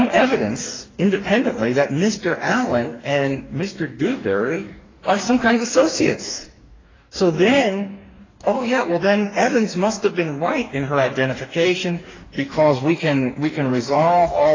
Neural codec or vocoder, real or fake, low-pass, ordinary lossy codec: codec, 44.1 kHz, 2.6 kbps, DAC; fake; 7.2 kHz; AAC, 32 kbps